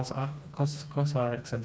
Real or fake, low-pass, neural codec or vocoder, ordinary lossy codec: fake; none; codec, 16 kHz, 2 kbps, FreqCodec, smaller model; none